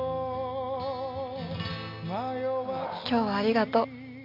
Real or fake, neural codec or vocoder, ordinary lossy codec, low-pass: real; none; MP3, 48 kbps; 5.4 kHz